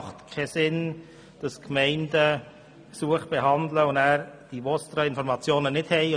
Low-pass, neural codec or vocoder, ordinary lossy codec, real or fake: 9.9 kHz; none; none; real